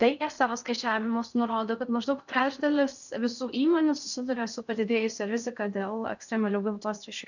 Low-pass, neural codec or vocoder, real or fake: 7.2 kHz; codec, 16 kHz in and 24 kHz out, 0.6 kbps, FocalCodec, streaming, 4096 codes; fake